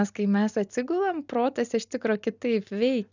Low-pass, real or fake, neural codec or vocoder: 7.2 kHz; real; none